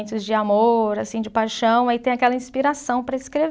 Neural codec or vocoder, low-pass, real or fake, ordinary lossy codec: none; none; real; none